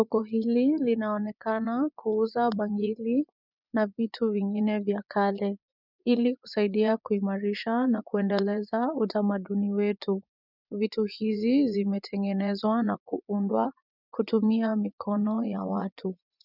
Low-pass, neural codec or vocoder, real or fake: 5.4 kHz; vocoder, 24 kHz, 100 mel bands, Vocos; fake